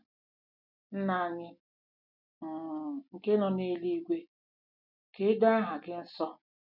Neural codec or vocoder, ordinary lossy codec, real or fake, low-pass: none; none; real; 5.4 kHz